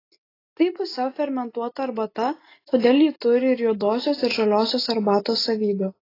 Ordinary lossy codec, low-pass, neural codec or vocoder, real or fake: AAC, 24 kbps; 5.4 kHz; none; real